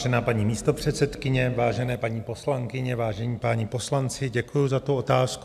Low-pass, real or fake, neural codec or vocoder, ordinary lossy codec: 14.4 kHz; real; none; AAC, 96 kbps